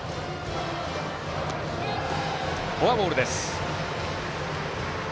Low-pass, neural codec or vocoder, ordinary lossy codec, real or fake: none; none; none; real